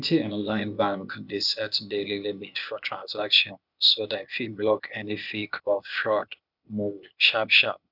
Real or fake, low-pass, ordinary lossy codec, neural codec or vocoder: fake; 5.4 kHz; none; codec, 16 kHz, 0.8 kbps, ZipCodec